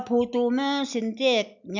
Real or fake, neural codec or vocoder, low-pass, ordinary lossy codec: real; none; 7.2 kHz; none